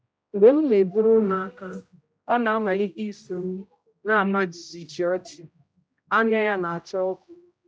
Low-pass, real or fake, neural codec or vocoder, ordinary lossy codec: none; fake; codec, 16 kHz, 0.5 kbps, X-Codec, HuBERT features, trained on general audio; none